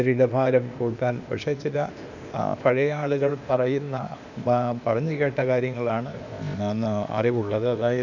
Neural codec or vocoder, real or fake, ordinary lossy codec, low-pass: codec, 16 kHz, 0.8 kbps, ZipCodec; fake; none; 7.2 kHz